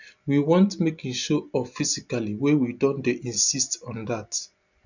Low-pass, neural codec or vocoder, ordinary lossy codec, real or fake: 7.2 kHz; none; none; real